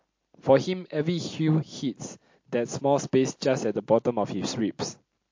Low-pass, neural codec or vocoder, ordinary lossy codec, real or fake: 7.2 kHz; none; MP3, 48 kbps; real